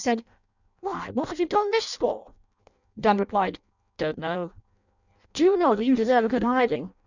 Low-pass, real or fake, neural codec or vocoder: 7.2 kHz; fake; codec, 16 kHz in and 24 kHz out, 0.6 kbps, FireRedTTS-2 codec